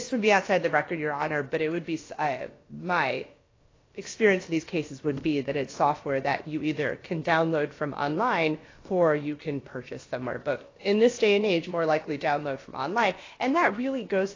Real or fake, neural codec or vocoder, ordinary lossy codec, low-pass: fake; codec, 16 kHz, about 1 kbps, DyCAST, with the encoder's durations; AAC, 32 kbps; 7.2 kHz